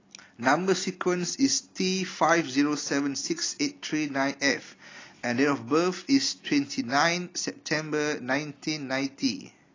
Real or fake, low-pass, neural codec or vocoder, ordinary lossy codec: real; 7.2 kHz; none; AAC, 32 kbps